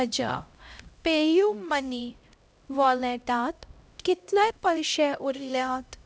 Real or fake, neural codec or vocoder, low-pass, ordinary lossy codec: fake; codec, 16 kHz, 0.5 kbps, X-Codec, HuBERT features, trained on LibriSpeech; none; none